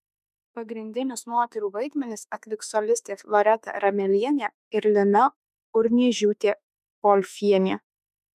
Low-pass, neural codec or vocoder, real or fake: 14.4 kHz; autoencoder, 48 kHz, 32 numbers a frame, DAC-VAE, trained on Japanese speech; fake